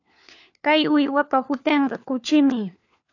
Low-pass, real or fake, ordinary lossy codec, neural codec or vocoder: 7.2 kHz; fake; AAC, 48 kbps; codec, 16 kHz, 4 kbps, FunCodec, trained on LibriTTS, 50 frames a second